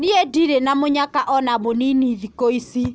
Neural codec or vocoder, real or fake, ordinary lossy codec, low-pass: none; real; none; none